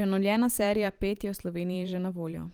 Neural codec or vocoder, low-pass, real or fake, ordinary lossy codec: vocoder, 44.1 kHz, 128 mel bands every 512 samples, BigVGAN v2; 19.8 kHz; fake; Opus, 24 kbps